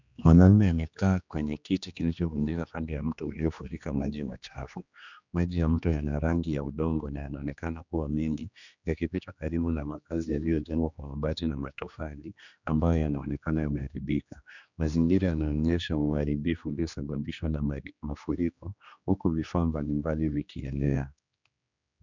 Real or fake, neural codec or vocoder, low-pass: fake; codec, 16 kHz, 2 kbps, X-Codec, HuBERT features, trained on general audio; 7.2 kHz